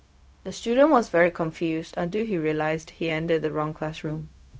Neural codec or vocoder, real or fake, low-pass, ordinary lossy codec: codec, 16 kHz, 0.4 kbps, LongCat-Audio-Codec; fake; none; none